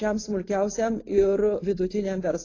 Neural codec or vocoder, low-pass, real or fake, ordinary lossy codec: none; 7.2 kHz; real; AAC, 32 kbps